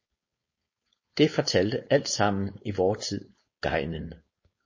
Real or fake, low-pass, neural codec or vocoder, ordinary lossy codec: fake; 7.2 kHz; codec, 16 kHz, 4.8 kbps, FACodec; MP3, 32 kbps